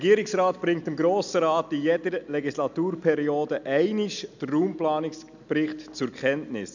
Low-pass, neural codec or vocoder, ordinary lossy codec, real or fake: 7.2 kHz; none; none; real